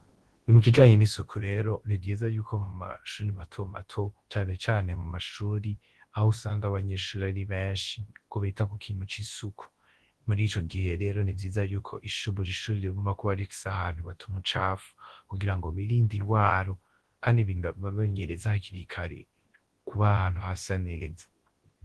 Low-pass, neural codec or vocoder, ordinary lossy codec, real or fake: 10.8 kHz; codec, 24 kHz, 0.9 kbps, WavTokenizer, large speech release; Opus, 16 kbps; fake